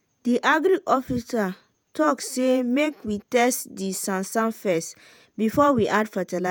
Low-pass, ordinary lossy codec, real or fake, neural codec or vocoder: none; none; fake; vocoder, 48 kHz, 128 mel bands, Vocos